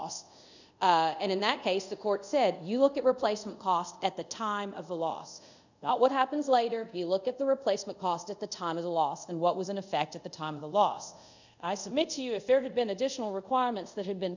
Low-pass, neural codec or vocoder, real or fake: 7.2 kHz; codec, 24 kHz, 0.5 kbps, DualCodec; fake